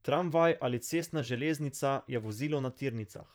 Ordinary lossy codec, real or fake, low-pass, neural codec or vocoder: none; fake; none; vocoder, 44.1 kHz, 128 mel bands, Pupu-Vocoder